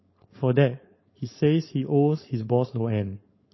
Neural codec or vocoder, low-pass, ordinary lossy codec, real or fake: codec, 16 kHz, 4.8 kbps, FACodec; 7.2 kHz; MP3, 24 kbps; fake